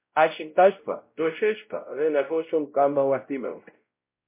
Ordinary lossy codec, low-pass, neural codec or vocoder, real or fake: MP3, 24 kbps; 3.6 kHz; codec, 16 kHz, 0.5 kbps, X-Codec, WavLM features, trained on Multilingual LibriSpeech; fake